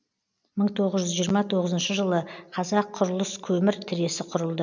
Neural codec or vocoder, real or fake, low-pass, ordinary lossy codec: none; real; 7.2 kHz; none